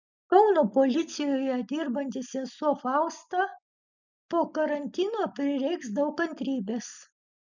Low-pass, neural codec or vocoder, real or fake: 7.2 kHz; none; real